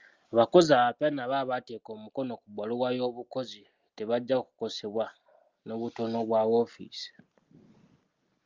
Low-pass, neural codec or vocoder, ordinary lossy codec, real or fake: 7.2 kHz; none; Opus, 32 kbps; real